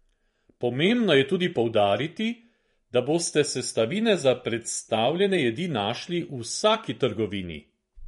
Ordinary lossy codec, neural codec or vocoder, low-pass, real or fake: MP3, 48 kbps; vocoder, 24 kHz, 100 mel bands, Vocos; 10.8 kHz; fake